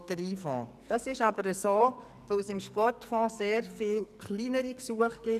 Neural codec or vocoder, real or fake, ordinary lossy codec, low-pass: codec, 44.1 kHz, 2.6 kbps, SNAC; fake; none; 14.4 kHz